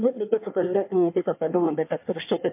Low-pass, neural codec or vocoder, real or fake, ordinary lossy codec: 3.6 kHz; codec, 24 kHz, 1 kbps, SNAC; fake; MP3, 32 kbps